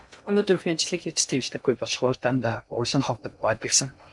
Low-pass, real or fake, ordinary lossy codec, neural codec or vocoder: 10.8 kHz; fake; MP3, 96 kbps; codec, 16 kHz in and 24 kHz out, 0.6 kbps, FocalCodec, streaming, 2048 codes